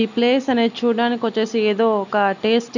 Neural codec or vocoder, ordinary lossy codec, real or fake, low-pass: none; none; real; 7.2 kHz